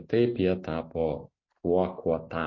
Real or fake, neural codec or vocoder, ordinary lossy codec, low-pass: real; none; MP3, 32 kbps; 7.2 kHz